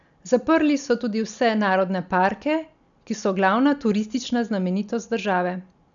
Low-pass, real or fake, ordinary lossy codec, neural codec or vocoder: 7.2 kHz; real; none; none